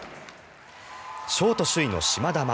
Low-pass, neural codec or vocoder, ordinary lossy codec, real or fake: none; none; none; real